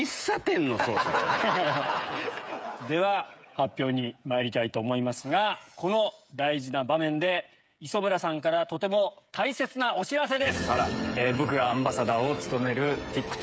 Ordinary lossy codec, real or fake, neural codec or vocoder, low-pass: none; fake; codec, 16 kHz, 8 kbps, FreqCodec, smaller model; none